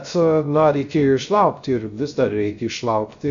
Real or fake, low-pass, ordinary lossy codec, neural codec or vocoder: fake; 7.2 kHz; MP3, 64 kbps; codec, 16 kHz, 0.3 kbps, FocalCodec